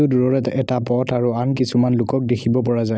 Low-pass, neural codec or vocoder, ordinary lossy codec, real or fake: none; none; none; real